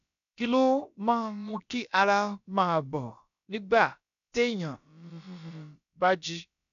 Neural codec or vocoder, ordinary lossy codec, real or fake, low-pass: codec, 16 kHz, about 1 kbps, DyCAST, with the encoder's durations; none; fake; 7.2 kHz